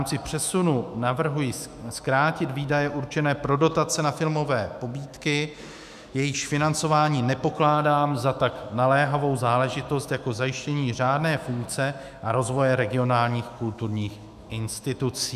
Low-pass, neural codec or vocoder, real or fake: 14.4 kHz; autoencoder, 48 kHz, 128 numbers a frame, DAC-VAE, trained on Japanese speech; fake